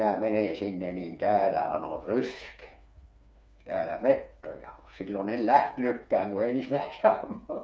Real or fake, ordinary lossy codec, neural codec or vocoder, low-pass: fake; none; codec, 16 kHz, 4 kbps, FreqCodec, smaller model; none